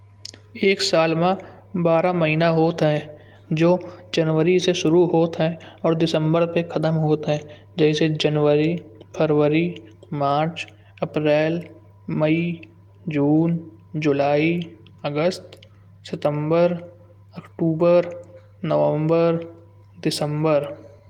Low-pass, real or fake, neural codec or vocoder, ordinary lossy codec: 19.8 kHz; real; none; Opus, 24 kbps